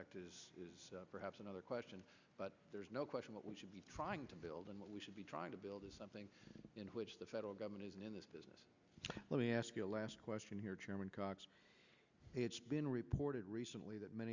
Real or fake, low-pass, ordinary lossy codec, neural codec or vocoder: real; 7.2 kHz; Opus, 64 kbps; none